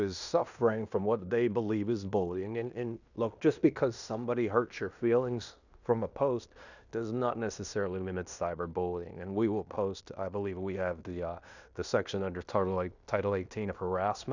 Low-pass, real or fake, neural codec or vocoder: 7.2 kHz; fake; codec, 16 kHz in and 24 kHz out, 0.9 kbps, LongCat-Audio-Codec, fine tuned four codebook decoder